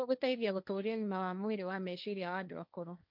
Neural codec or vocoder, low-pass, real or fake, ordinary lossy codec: codec, 16 kHz, 1.1 kbps, Voila-Tokenizer; 5.4 kHz; fake; none